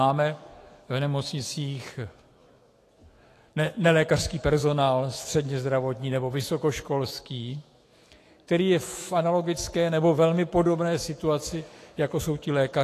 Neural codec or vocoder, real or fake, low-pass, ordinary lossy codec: autoencoder, 48 kHz, 128 numbers a frame, DAC-VAE, trained on Japanese speech; fake; 14.4 kHz; AAC, 48 kbps